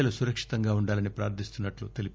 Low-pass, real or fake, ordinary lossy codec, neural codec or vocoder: none; real; none; none